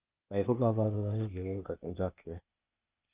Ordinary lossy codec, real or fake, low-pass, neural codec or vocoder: Opus, 32 kbps; fake; 3.6 kHz; codec, 16 kHz, 0.8 kbps, ZipCodec